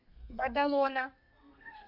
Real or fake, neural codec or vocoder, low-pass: fake; codec, 32 kHz, 1.9 kbps, SNAC; 5.4 kHz